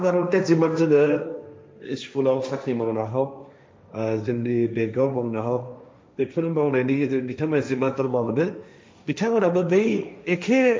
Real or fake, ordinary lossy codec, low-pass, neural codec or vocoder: fake; none; none; codec, 16 kHz, 1.1 kbps, Voila-Tokenizer